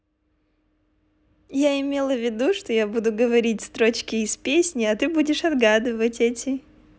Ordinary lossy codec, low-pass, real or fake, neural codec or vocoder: none; none; real; none